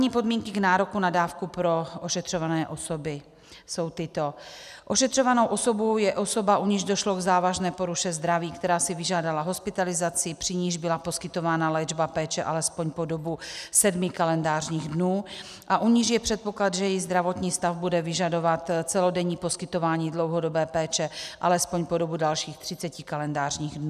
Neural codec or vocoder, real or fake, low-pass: none; real; 14.4 kHz